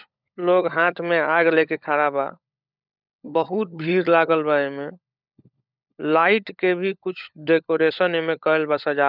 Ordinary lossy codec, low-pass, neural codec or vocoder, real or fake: none; 5.4 kHz; codec, 16 kHz, 16 kbps, FunCodec, trained on LibriTTS, 50 frames a second; fake